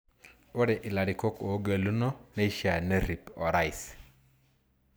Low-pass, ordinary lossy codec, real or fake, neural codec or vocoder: none; none; real; none